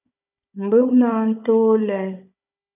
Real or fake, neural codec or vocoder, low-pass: fake; codec, 16 kHz, 16 kbps, FunCodec, trained on Chinese and English, 50 frames a second; 3.6 kHz